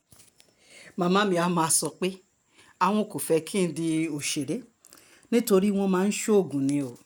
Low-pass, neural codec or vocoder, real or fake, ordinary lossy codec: none; none; real; none